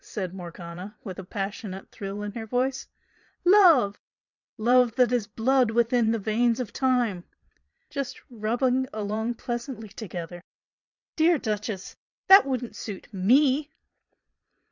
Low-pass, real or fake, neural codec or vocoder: 7.2 kHz; fake; vocoder, 22.05 kHz, 80 mel bands, Vocos